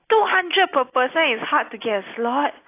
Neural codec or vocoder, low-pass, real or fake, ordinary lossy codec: none; 3.6 kHz; real; AAC, 24 kbps